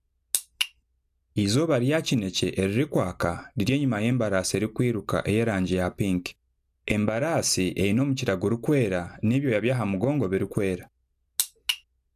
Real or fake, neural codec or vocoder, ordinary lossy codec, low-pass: real; none; none; 14.4 kHz